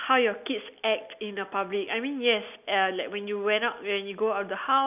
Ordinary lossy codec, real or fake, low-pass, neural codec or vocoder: none; real; 3.6 kHz; none